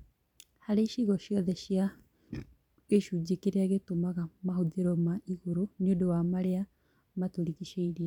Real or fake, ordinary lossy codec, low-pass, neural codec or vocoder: fake; none; 19.8 kHz; vocoder, 44.1 kHz, 128 mel bands every 512 samples, BigVGAN v2